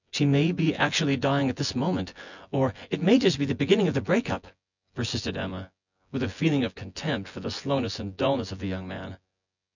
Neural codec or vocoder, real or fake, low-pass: vocoder, 24 kHz, 100 mel bands, Vocos; fake; 7.2 kHz